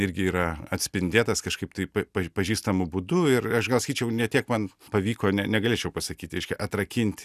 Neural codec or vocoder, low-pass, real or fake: none; 14.4 kHz; real